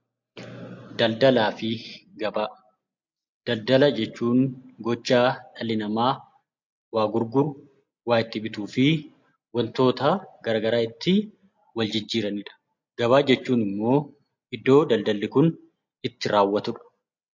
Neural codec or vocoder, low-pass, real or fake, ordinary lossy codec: none; 7.2 kHz; real; MP3, 48 kbps